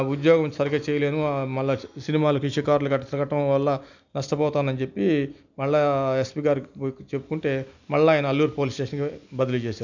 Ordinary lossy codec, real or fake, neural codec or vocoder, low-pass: none; real; none; 7.2 kHz